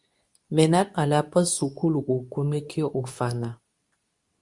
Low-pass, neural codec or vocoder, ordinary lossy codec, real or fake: 10.8 kHz; codec, 24 kHz, 0.9 kbps, WavTokenizer, medium speech release version 2; Opus, 64 kbps; fake